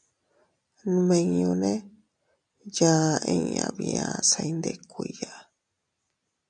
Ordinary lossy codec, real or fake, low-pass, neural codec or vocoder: MP3, 96 kbps; real; 9.9 kHz; none